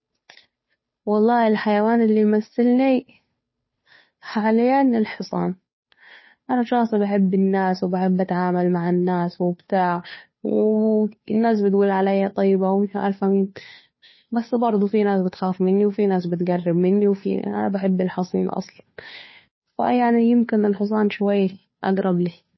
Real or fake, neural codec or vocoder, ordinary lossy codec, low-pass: fake; codec, 16 kHz, 2 kbps, FunCodec, trained on Chinese and English, 25 frames a second; MP3, 24 kbps; 7.2 kHz